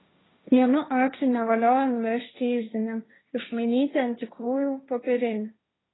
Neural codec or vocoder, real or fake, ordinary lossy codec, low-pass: codec, 16 kHz, 1.1 kbps, Voila-Tokenizer; fake; AAC, 16 kbps; 7.2 kHz